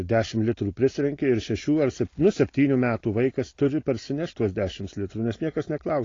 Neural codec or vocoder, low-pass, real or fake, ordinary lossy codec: none; 7.2 kHz; real; AAC, 32 kbps